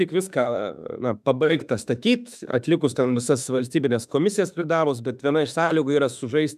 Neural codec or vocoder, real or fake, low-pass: autoencoder, 48 kHz, 32 numbers a frame, DAC-VAE, trained on Japanese speech; fake; 14.4 kHz